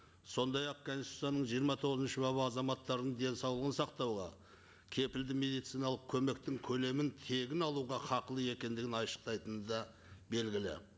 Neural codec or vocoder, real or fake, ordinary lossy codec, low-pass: none; real; none; none